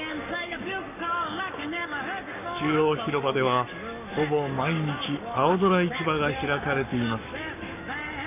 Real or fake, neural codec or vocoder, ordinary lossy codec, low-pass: fake; codec, 44.1 kHz, 7.8 kbps, Pupu-Codec; none; 3.6 kHz